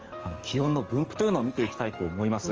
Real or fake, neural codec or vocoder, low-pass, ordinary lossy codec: fake; codec, 44.1 kHz, 7.8 kbps, DAC; 7.2 kHz; Opus, 24 kbps